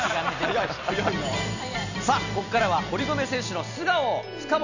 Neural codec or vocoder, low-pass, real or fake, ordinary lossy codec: none; 7.2 kHz; real; none